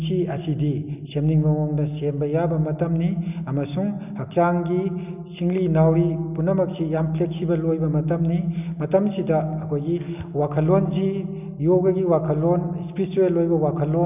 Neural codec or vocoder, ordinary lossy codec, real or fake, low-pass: none; none; real; 3.6 kHz